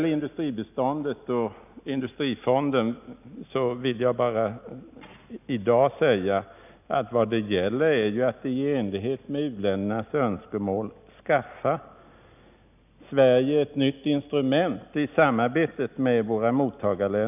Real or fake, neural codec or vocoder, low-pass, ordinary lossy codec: real; none; 3.6 kHz; none